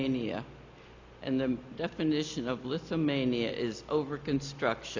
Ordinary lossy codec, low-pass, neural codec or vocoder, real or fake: MP3, 64 kbps; 7.2 kHz; none; real